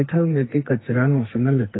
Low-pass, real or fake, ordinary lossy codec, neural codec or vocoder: 7.2 kHz; fake; AAC, 16 kbps; codec, 44.1 kHz, 2.6 kbps, SNAC